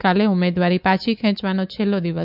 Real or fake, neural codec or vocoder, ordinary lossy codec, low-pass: fake; codec, 24 kHz, 3.1 kbps, DualCodec; none; 5.4 kHz